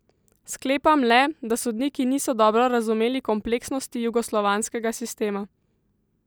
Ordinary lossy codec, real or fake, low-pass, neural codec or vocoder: none; real; none; none